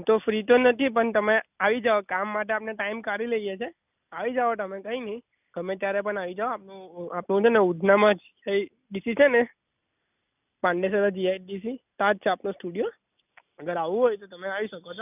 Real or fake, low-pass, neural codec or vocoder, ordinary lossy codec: real; 3.6 kHz; none; none